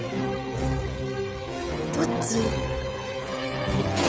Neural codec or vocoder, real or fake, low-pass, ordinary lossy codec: codec, 16 kHz, 16 kbps, FreqCodec, smaller model; fake; none; none